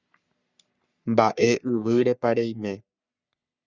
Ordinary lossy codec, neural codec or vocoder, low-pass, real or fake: Opus, 64 kbps; codec, 44.1 kHz, 3.4 kbps, Pupu-Codec; 7.2 kHz; fake